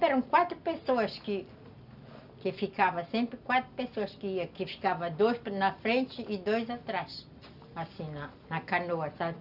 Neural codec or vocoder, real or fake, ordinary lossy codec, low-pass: none; real; Opus, 64 kbps; 5.4 kHz